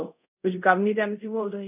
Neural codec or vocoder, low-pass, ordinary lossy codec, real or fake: codec, 24 kHz, 0.5 kbps, DualCodec; 3.6 kHz; none; fake